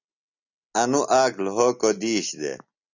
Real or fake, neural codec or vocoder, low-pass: real; none; 7.2 kHz